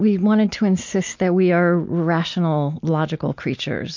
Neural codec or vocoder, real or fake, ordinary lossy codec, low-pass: none; real; MP3, 48 kbps; 7.2 kHz